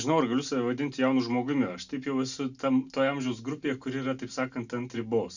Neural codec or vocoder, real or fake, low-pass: none; real; 7.2 kHz